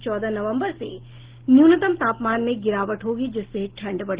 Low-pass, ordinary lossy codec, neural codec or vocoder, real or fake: 3.6 kHz; Opus, 16 kbps; none; real